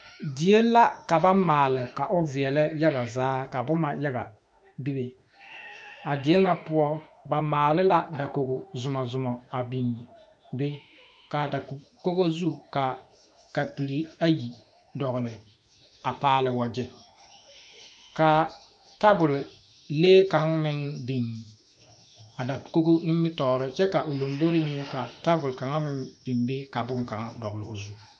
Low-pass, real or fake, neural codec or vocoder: 9.9 kHz; fake; autoencoder, 48 kHz, 32 numbers a frame, DAC-VAE, trained on Japanese speech